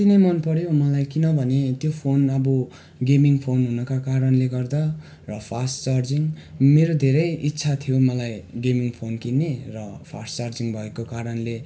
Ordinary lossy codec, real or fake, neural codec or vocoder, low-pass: none; real; none; none